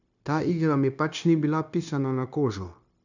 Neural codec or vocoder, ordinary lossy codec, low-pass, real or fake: codec, 16 kHz, 0.9 kbps, LongCat-Audio-Codec; none; 7.2 kHz; fake